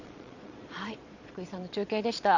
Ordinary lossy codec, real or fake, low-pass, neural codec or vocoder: none; fake; 7.2 kHz; vocoder, 22.05 kHz, 80 mel bands, Vocos